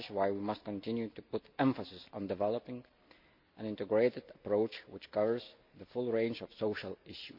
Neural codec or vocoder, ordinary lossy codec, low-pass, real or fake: none; MP3, 48 kbps; 5.4 kHz; real